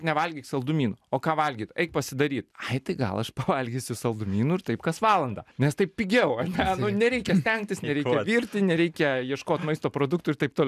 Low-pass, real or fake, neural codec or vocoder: 14.4 kHz; real; none